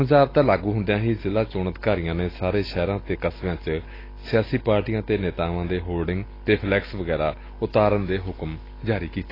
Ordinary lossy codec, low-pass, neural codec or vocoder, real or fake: AAC, 24 kbps; 5.4 kHz; none; real